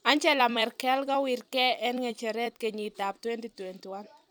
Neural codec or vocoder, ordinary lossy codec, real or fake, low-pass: vocoder, 44.1 kHz, 128 mel bands every 256 samples, BigVGAN v2; none; fake; none